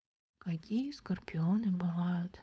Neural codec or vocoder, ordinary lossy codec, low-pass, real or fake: codec, 16 kHz, 4.8 kbps, FACodec; none; none; fake